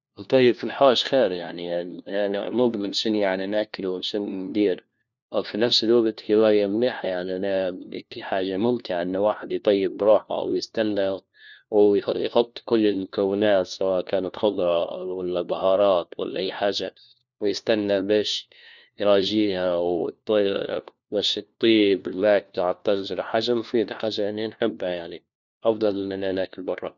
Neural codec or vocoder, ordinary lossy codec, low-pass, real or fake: codec, 16 kHz, 1 kbps, FunCodec, trained on LibriTTS, 50 frames a second; none; 7.2 kHz; fake